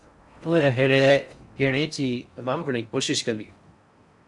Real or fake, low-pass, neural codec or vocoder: fake; 10.8 kHz; codec, 16 kHz in and 24 kHz out, 0.6 kbps, FocalCodec, streaming, 4096 codes